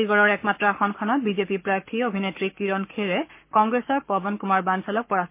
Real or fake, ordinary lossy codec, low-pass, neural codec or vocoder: fake; MP3, 24 kbps; 3.6 kHz; codec, 44.1 kHz, 7.8 kbps, Pupu-Codec